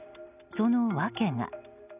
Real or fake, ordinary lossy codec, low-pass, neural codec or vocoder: real; none; 3.6 kHz; none